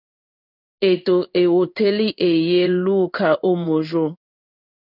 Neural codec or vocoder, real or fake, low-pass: codec, 16 kHz in and 24 kHz out, 1 kbps, XY-Tokenizer; fake; 5.4 kHz